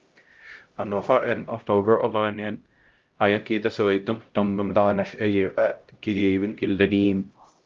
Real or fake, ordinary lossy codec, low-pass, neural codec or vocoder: fake; Opus, 32 kbps; 7.2 kHz; codec, 16 kHz, 0.5 kbps, X-Codec, HuBERT features, trained on LibriSpeech